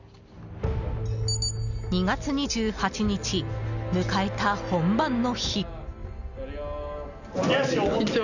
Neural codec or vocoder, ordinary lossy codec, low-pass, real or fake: none; none; 7.2 kHz; real